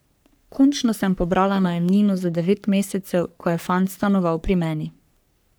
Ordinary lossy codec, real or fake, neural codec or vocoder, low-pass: none; fake; codec, 44.1 kHz, 3.4 kbps, Pupu-Codec; none